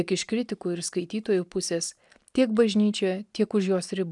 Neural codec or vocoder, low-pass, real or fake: none; 10.8 kHz; real